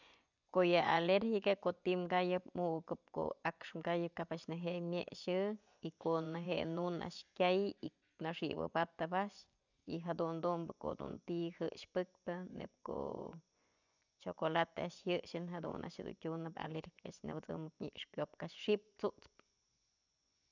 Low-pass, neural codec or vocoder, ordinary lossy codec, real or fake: 7.2 kHz; vocoder, 24 kHz, 100 mel bands, Vocos; none; fake